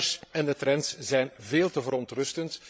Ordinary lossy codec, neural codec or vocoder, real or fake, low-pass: none; codec, 16 kHz, 16 kbps, FreqCodec, larger model; fake; none